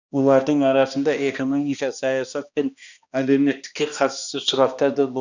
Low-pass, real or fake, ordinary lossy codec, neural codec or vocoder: 7.2 kHz; fake; none; codec, 16 kHz, 1 kbps, X-Codec, HuBERT features, trained on balanced general audio